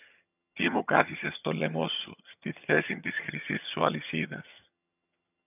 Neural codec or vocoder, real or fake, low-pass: vocoder, 22.05 kHz, 80 mel bands, HiFi-GAN; fake; 3.6 kHz